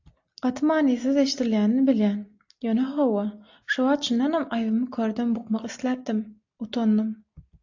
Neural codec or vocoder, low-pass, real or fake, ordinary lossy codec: none; 7.2 kHz; real; AAC, 48 kbps